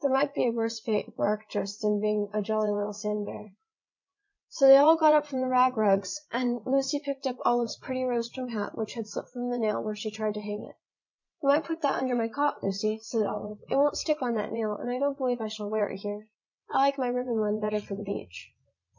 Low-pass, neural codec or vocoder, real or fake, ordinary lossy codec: 7.2 kHz; vocoder, 22.05 kHz, 80 mel bands, Vocos; fake; MP3, 64 kbps